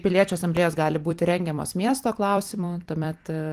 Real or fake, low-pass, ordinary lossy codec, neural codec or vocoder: fake; 14.4 kHz; Opus, 24 kbps; vocoder, 44.1 kHz, 128 mel bands every 256 samples, BigVGAN v2